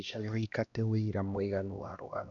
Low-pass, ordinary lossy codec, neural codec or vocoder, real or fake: 7.2 kHz; MP3, 96 kbps; codec, 16 kHz, 1 kbps, X-Codec, HuBERT features, trained on LibriSpeech; fake